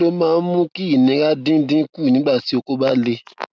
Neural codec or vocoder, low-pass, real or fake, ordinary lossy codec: none; none; real; none